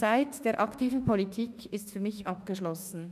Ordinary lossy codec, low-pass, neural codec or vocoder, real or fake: none; 14.4 kHz; autoencoder, 48 kHz, 32 numbers a frame, DAC-VAE, trained on Japanese speech; fake